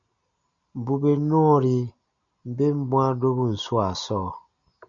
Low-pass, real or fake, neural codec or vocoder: 7.2 kHz; real; none